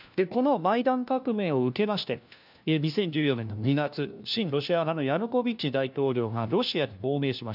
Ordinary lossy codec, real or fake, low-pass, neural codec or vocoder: none; fake; 5.4 kHz; codec, 16 kHz, 1 kbps, FunCodec, trained on LibriTTS, 50 frames a second